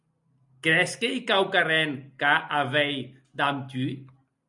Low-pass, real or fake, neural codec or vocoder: 10.8 kHz; real; none